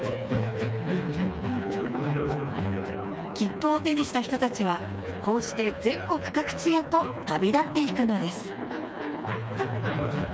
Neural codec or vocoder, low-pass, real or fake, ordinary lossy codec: codec, 16 kHz, 2 kbps, FreqCodec, smaller model; none; fake; none